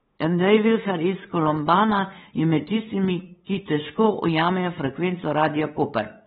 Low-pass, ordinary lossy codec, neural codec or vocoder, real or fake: 7.2 kHz; AAC, 16 kbps; codec, 16 kHz, 8 kbps, FunCodec, trained on LibriTTS, 25 frames a second; fake